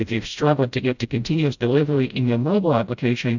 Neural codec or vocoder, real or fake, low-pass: codec, 16 kHz, 0.5 kbps, FreqCodec, smaller model; fake; 7.2 kHz